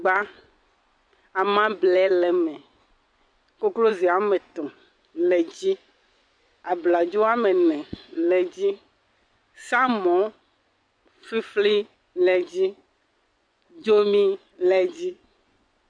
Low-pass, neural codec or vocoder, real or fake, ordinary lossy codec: 9.9 kHz; vocoder, 24 kHz, 100 mel bands, Vocos; fake; MP3, 64 kbps